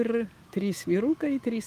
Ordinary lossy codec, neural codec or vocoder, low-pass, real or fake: Opus, 24 kbps; autoencoder, 48 kHz, 128 numbers a frame, DAC-VAE, trained on Japanese speech; 14.4 kHz; fake